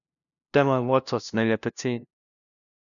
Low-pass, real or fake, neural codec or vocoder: 7.2 kHz; fake; codec, 16 kHz, 0.5 kbps, FunCodec, trained on LibriTTS, 25 frames a second